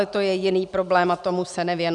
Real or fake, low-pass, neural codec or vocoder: real; 10.8 kHz; none